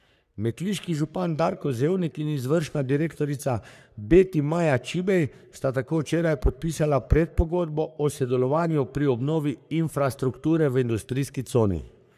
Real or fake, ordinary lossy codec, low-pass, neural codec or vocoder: fake; none; 14.4 kHz; codec, 44.1 kHz, 3.4 kbps, Pupu-Codec